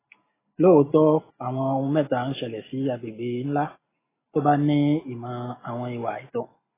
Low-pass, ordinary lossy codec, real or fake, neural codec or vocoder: 3.6 kHz; AAC, 16 kbps; real; none